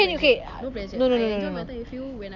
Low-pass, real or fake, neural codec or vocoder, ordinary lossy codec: 7.2 kHz; real; none; none